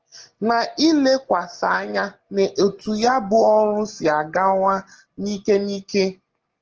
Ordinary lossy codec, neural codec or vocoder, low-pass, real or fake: Opus, 16 kbps; none; 7.2 kHz; real